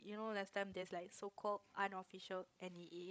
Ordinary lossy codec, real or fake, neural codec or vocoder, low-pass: none; fake; codec, 16 kHz, 16 kbps, FreqCodec, larger model; none